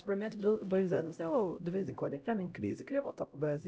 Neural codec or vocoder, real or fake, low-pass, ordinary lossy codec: codec, 16 kHz, 0.5 kbps, X-Codec, HuBERT features, trained on LibriSpeech; fake; none; none